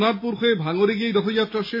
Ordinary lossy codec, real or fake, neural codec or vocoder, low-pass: MP3, 24 kbps; real; none; 5.4 kHz